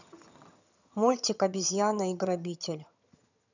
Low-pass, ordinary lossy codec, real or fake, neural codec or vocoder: 7.2 kHz; none; fake; vocoder, 22.05 kHz, 80 mel bands, HiFi-GAN